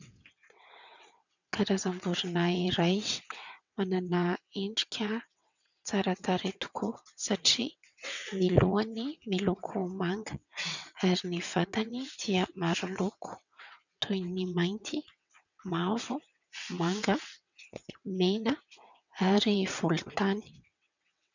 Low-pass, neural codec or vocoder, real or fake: 7.2 kHz; vocoder, 22.05 kHz, 80 mel bands, WaveNeXt; fake